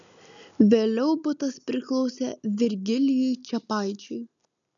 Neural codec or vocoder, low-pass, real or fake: none; 7.2 kHz; real